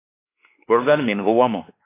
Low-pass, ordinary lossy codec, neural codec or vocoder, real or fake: 3.6 kHz; AAC, 24 kbps; codec, 16 kHz, 2 kbps, X-Codec, WavLM features, trained on Multilingual LibriSpeech; fake